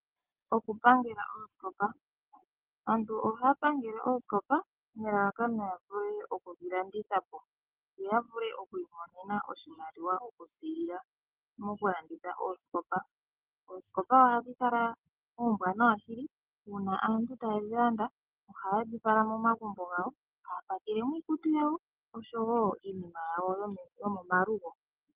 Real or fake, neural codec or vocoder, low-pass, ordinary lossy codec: real; none; 3.6 kHz; Opus, 16 kbps